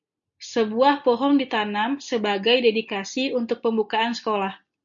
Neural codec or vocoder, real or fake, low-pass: none; real; 7.2 kHz